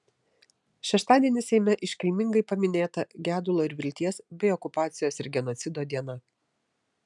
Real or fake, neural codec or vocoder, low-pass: real; none; 10.8 kHz